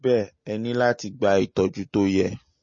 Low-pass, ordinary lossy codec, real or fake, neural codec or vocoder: 7.2 kHz; MP3, 32 kbps; real; none